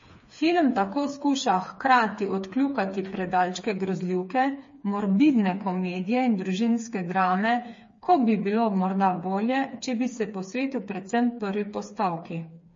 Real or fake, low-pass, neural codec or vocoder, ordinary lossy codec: fake; 7.2 kHz; codec, 16 kHz, 4 kbps, FreqCodec, smaller model; MP3, 32 kbps